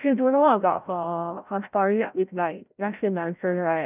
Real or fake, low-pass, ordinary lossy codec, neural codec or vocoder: fake; 3.6 kHz; none; codec, 16 kHz, 0.5 kbps, FreqCodec, larger model